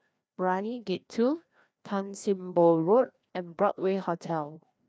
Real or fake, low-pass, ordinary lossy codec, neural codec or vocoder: fake; none; none; codec, 16 kHz, 1 kbps, FreqCodec, larger model